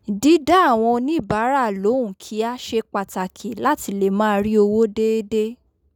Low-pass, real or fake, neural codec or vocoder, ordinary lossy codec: 19.8 kHz; real; none; none